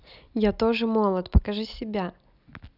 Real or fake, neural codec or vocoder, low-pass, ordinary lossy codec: real; none; 5.4 kHz; none